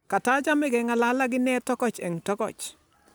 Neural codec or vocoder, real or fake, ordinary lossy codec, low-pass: none; real; none; none